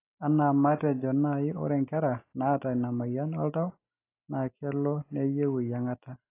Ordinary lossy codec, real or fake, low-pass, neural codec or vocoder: AAC, 24 kbps; real; 3.6 kHz; none